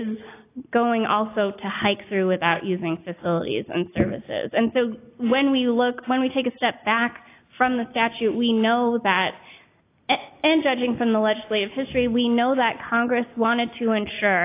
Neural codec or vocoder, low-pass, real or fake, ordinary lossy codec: none; 3.6 kHz; real; AAC, 24 kbps